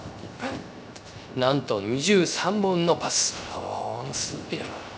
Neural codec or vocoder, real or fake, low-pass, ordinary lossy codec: codec, 16 kHz, 0.3 kbps, FocalCodec; fake; none; none